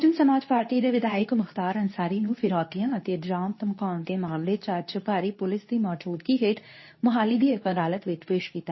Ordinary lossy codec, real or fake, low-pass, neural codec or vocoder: MP3, 24 kbps; fake; 7.2 kHz; codec, 24 kHz, 0.9 kbps, WavTokenizer, medium speech release version 2